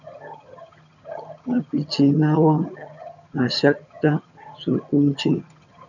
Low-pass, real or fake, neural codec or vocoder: 7.2 kHz; fake; vocoder, 22.05 kHz, 80 mel bands, HiFi-GAN